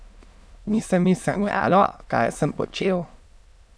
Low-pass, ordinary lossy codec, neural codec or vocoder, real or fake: none; none; autoencoder, 22.05 kHz, a latent of 192 numbers a frame, VITS, trained on many speakers; fake